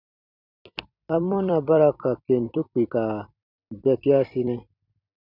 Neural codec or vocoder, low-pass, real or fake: vocoder, 44.1 kHz, 128 mel bands every 256 samples, BigVGAN v2; 5.4 kHz; fake